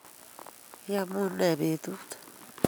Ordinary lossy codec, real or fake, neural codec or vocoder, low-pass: none; real; none; none